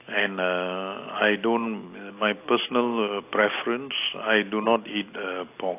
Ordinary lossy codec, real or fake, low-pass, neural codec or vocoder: AAC, 24 kbps; real; 3.6 kHz; none